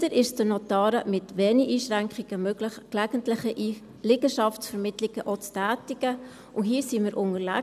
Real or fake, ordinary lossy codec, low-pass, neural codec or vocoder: real; none; 14.4 kHz; none